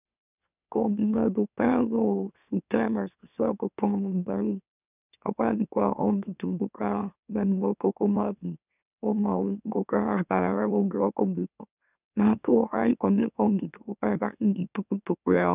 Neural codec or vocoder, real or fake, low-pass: autoencoder, 44.1 kHz, a latent of 192 numbers a frame, MeloTTS; fake; 3.6 kHz